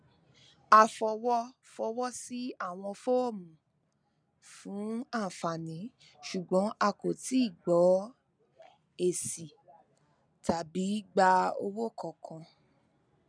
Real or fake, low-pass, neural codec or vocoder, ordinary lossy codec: real; 9.9 kHz; none; none